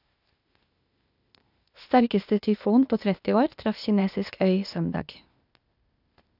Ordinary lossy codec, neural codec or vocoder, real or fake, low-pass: none; codec, 16 kHz, 0.8 kbps, ZipCodec; fake; 5.4 kHz